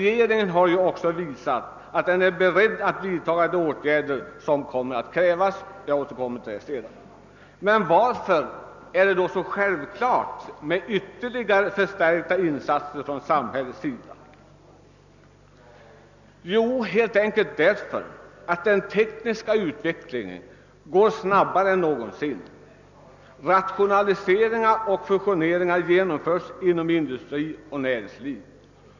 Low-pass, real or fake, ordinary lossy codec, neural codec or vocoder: 7.2 kHz; real; none; none